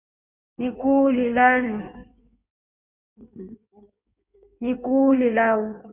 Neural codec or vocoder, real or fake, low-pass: codec, 16 kHz in and 24 kHz out, 1.1 kbps, FireRedTTS-2 codec; fake; 3.6 kHz